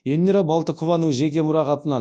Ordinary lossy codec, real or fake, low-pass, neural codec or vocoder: none; fake; 9.9 kHz; codec, 24 kHz, 0.9 kbps, WavTokenizer, large speech release